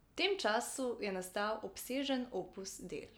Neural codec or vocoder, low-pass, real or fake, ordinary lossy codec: none; none; real; none